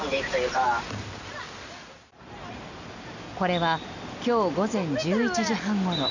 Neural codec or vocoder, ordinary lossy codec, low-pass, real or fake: none; none; 7.2 kHz; real